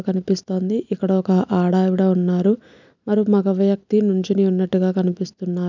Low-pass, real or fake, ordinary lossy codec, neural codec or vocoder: 7.2 kHz; real; none; none